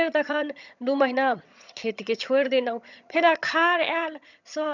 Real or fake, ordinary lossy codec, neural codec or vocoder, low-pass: fake; none; vocoder, 22.05 kHz, 80 mel bands, HiFi-GAN; 7.2 kHz